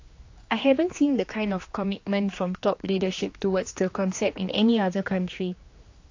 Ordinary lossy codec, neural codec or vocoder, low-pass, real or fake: AAC, 48 kbps; codec, 16 kHz, 2 kbps, X-Codec, HuBERT features, trained on general audio; 7.2 kHz; fake